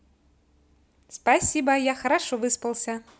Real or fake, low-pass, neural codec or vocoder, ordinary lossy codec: real; none; none; none